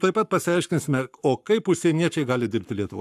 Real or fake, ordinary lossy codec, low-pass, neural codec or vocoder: fake; AAC, 96 kbps; 14.4 kHz; codec, 44.1 kHz, 7.8 kbps, Pupu-Codec